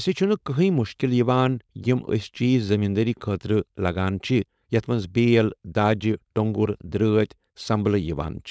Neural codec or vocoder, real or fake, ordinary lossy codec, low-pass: codec, 16 kHz, 4.8 kbps, FACodec; fake; none; none